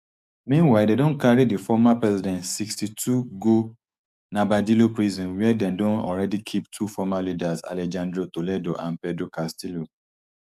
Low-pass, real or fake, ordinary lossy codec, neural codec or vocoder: 14.4 kHz; fake; none; codec, 44.1 kHz, 7.8 kbps, DAC